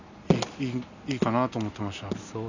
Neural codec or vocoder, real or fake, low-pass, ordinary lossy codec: none; real; 7.2 kHz; none